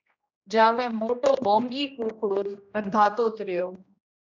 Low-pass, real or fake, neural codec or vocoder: 7.2 kHz; fake; codec, 16 kHz, 1 kbps, X-Codec, HuBERT features, trained on general audio